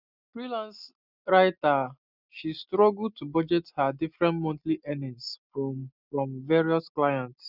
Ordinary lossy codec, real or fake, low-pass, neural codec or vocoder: none; real; 5.4 kHz; none